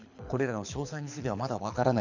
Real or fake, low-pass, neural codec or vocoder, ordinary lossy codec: fake; 7.2 kHz; codec, 24 kHz, 6 kbps, HILCodec; none